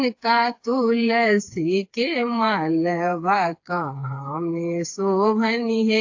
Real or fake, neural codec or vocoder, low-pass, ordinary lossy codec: fake; codec, 16 kHz, 4 kbps, FreqCodec, smaller model; 7.2 kHz; AAC, 48 kbps